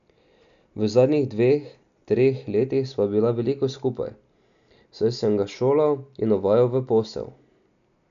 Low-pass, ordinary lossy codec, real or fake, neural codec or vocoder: 7.2 kHz; none; real; none